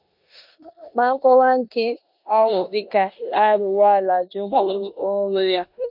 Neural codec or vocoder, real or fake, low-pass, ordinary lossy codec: codec, 16 kHz in and 24 kHz out, 0.9 kbps, LongCat-Audio-Codec, four codebook decoder; fake; 5.4 kHz; MP3, 48 kbps